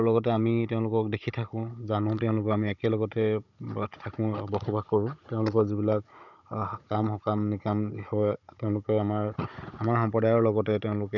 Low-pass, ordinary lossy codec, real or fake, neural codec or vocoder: 7.2 kHz; none; fake; codec, 16 kHz, 16 kbps, FunCodec, trained on Chinese and English, 50 frames a second